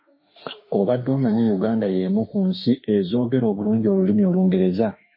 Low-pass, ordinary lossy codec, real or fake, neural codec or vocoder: 5.4 kHz; MP3, 24 kbps; fake; autoencoder, 48 kHz, 32 numbers a frame, DAC-VAE, trained on Japanese speech